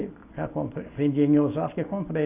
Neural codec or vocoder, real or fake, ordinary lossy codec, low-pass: none; real; AAC, 24 kbps; 3.6 kHz